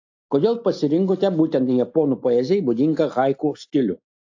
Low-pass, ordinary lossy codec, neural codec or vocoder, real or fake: 7.2 kHz; AAC, 48 kbps; none; real